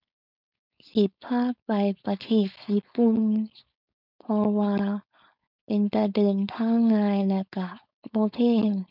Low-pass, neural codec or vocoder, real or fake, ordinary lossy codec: 5.4 kHz; codec, 16 kHz, 4.8 kbps, FACodec; fake; none